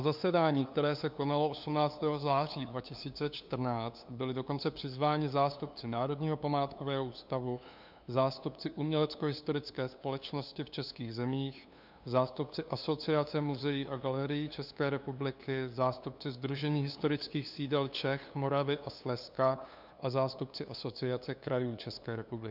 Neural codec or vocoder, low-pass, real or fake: codec, 16 kHz, 2 kbps, FunCodec, trained on LibriTTS, 25 frames a second; 5.4 kHz; fake